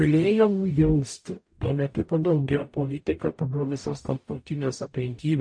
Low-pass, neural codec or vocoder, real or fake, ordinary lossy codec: 9.9 kHz; codec, 44.1 kHz, 0.9 kbps, DAC; fake; MP3, 48 kbps